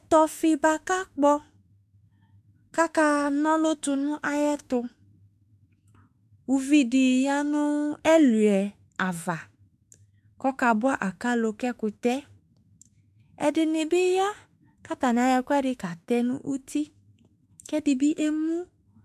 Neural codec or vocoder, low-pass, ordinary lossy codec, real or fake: autoencoder, 48 kHz, 32 numbers a frame, DAC-VAE, trained on Japanese speech; 14.4 kHz; AAC, 96 kbps; fake